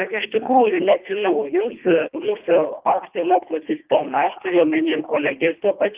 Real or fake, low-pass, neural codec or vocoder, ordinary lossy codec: fake; 3.6 kHz; codec, 24 kHz, 1.5 kbps, HILCodec; Opus, 32 kbps